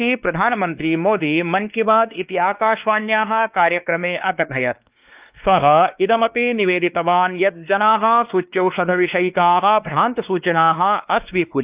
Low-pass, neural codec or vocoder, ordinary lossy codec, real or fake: 3.6 kHz; codec, 16 kHz, 2 kbps, X-Codec, WavLM features, trained on Multilingual LibriSpeech; Opus, 24 kbps; fake